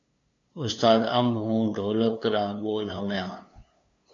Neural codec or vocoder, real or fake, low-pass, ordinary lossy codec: codec, 16 kHz, 2 kbps, FunCodec, trained on LibriTTS, 25 frames a second; fake; 7.2 kHz; AAC, 48 kbps